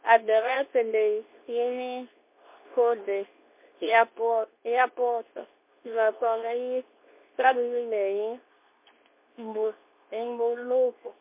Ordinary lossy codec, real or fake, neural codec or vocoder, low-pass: MP3, 32 kbps; fake; codec, 24 kHz, 0.9 kbps, WavTokenizer, medium speech release version 2; 3.6 kHz